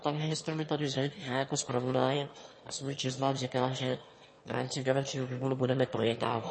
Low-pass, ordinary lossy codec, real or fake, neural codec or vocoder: 9.9 kHz; MP3, 32 kbps; fake; autoencoder, 22.05 kHz, a latent of 192 numbers a frame, VITS, trained on one speaker